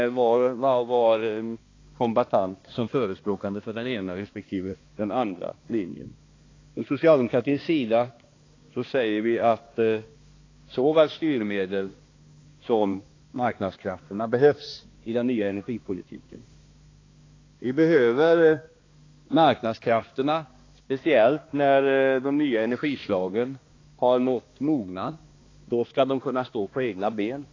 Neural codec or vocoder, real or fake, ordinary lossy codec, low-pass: codec, 16 kHz, 2 kbps, X-Codec, HuBERT features, trained on balanced general audio; fake; AAC, 32 kbps; 7.2 kHz